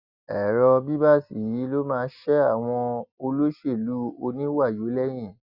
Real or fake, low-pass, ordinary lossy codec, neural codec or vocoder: real; 5.4 kHz; Opus, 64 kbps; none